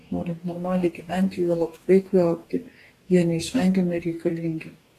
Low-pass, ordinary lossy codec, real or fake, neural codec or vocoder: 14.4 kHz; AAC, 48 kbps; fake; codec, 44.1 kHz, 2.6 kbps, DAC